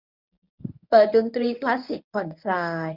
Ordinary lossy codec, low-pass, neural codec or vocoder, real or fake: none; 5.4 kHz; codec, 24 kHz, 0.9 kbps, WavTokenizer, medium speech release version 1; fake